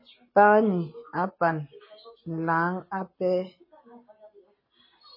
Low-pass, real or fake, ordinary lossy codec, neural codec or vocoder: 5.4 kHz; real; MP3, 24 kbps; none